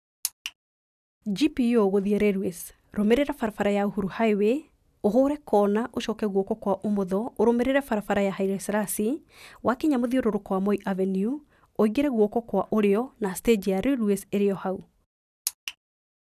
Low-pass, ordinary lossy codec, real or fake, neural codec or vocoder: 14.4 kHz; none; real; none